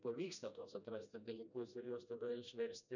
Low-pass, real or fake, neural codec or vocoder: 7.2 kHz; fake; codec, 16 kHz, 1 kbps, FreqCodec, smaller model